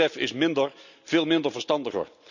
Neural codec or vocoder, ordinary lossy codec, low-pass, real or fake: none; none; 7.2 kHz; real